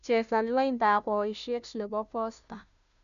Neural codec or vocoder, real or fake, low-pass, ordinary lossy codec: codec, 16 kHz, 0.5 kbps, FunCodec, trained on Chinese and English, 25 frames a second; fake; 7.2 kHz; none